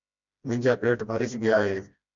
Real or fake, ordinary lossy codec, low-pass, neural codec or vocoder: fake; MP3, 64 kbps; 7.2 kHz; codec, 16 kHz, 1 kbps, FreqCodec, smaller model